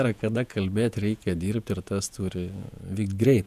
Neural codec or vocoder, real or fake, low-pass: vocoder, 48 kHz, 128 mel bands, Vocos; fake; 14.4 kHz